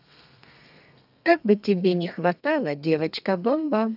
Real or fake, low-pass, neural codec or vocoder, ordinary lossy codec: fake; 5.4 kHz; codec, 32 kHz, 1.9 kbps, SNAC; none